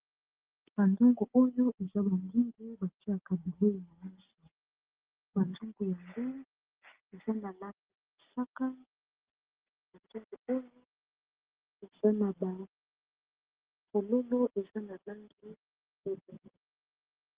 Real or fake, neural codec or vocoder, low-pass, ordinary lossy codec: real; none; 3.6 kHz; Opus, 16 kbps